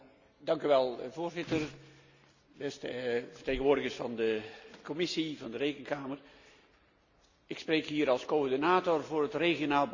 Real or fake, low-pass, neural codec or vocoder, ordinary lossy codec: real; 7.2 kHz; none; Opus, 64 kbps